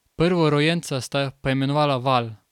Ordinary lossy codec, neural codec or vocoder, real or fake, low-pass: none; none; real; 19.8 kHz